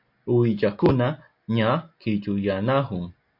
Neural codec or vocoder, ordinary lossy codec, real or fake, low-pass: none; MP3, 48 kbps; real; 5.4 kHz